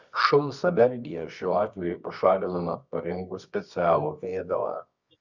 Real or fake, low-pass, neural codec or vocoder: fake; 7.2 kHz; codec, 24 kHz, 0.9 kbps, WavTokenizer, medium music audio release